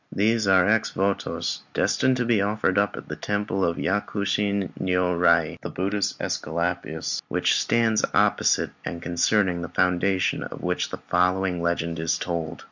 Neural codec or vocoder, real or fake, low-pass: none; real; 7.2 kHz